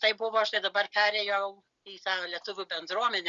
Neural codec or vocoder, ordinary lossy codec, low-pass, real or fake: none; Opus, 64 kbps; 7.2 kHz; real